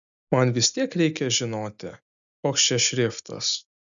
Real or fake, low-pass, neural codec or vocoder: real; 7.2 kHz; none